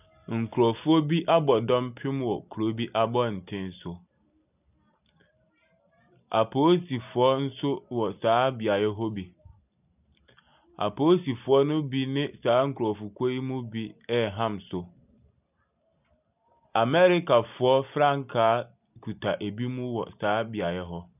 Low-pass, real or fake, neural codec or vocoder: 3.6 kHz; real; none